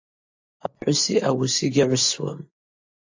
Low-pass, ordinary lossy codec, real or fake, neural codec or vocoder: 7.2 kHz; AAC, 48 kbps; real; none